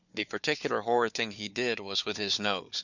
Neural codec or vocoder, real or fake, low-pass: codec, 16 kHz, 4 kbps, FunCodec, trained on Chinese and English, 50 frames a second; fake; 7.2 kHz